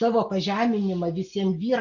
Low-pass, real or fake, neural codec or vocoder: 7.2 kHz; real; none